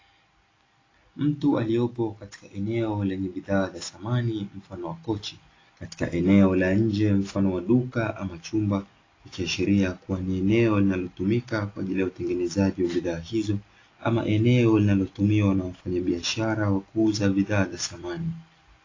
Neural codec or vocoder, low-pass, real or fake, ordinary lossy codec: none; 7.2 kHz; real; AAC, 32 kbps